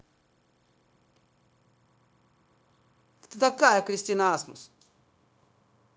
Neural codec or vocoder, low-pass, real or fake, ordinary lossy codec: codec, 16 kHz, 0.9 kbps, LongCat-Audio-Codec; none; fake; none